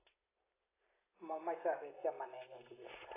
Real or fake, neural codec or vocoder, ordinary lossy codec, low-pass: real; none; AAC, 16 kbps; 3.6 kHz